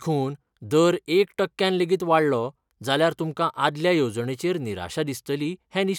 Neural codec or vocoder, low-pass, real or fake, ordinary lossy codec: none; 19.8 kHz; real; none